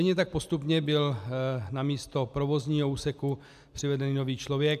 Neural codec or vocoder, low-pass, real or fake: none; 14.4 kHz; real